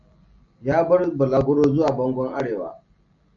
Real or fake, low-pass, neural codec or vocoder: real; 7.2 kHz; none